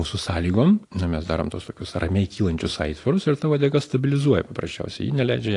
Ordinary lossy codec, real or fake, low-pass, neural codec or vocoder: AAC, 48 kbps; real; 10.8 kHz; none